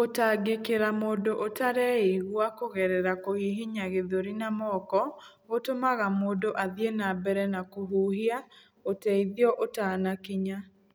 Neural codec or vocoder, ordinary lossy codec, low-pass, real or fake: none; none; none; real